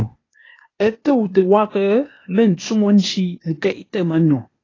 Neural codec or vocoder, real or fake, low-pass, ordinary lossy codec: codec, 16 kHz, 0.8 kbps, ZipCodec; fake; 7.2 kHz; AAC, 32 kbps